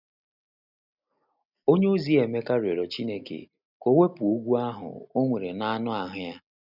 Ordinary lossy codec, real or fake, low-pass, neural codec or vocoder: none; real; 5.4 kHz; none